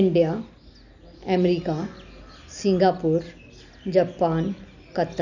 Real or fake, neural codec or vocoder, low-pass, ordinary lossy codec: real; none; 7.2 kHz; none